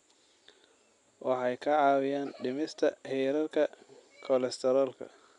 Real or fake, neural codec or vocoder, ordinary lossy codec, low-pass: real; none; none; 10.8 kHz